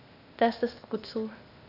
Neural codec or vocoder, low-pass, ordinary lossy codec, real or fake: codec, 16 kHz, 0.8 kbps, ZipCodec; 5.4 kHz; none; fake